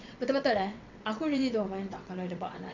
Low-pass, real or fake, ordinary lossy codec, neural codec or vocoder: 7.2 kHz; fake; none; vocoder, 22.05 kHz, 80 mel bands, WaveNeXt